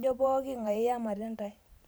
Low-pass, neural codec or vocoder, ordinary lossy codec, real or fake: none; vocoder, 44.1 kHz, 128 mel bands every 512 samples, BigVGAN v2; none; fake